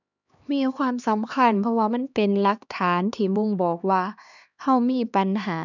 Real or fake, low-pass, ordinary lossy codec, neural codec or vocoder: fake; 7.2 kHz; none; codec, 16 kHz, 2 kbps, X-Codec, HuBERT features, trained on LibriSpeech